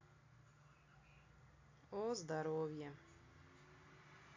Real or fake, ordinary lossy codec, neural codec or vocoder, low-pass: real; AAC, 32 kbps; none; 7.2 kHz